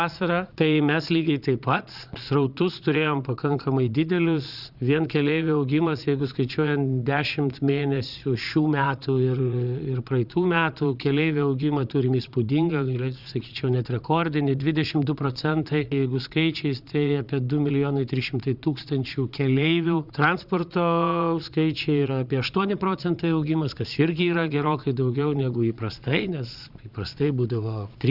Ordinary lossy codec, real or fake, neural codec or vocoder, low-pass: Opus, 64 kbps; fake; vocoder, 22.05 kHz, 80 mel bands, Vocos; 5.4 kHz